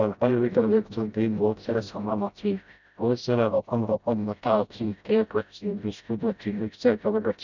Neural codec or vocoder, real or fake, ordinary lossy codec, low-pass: codec, 16 kHz, 0.5 kbps, FreqCodec, smaller model; fake; none; 7.2 kHz